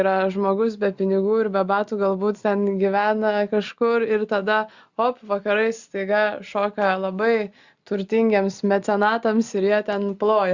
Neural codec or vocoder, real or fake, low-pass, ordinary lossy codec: none; real; 7.2 kHz; Opus, 64 kbps